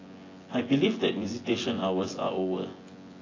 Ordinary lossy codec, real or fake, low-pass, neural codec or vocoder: AAC, 32 kbps; fake; 7.2 kHz; vocoder, 24 kHz, 100 mel bands, Vocos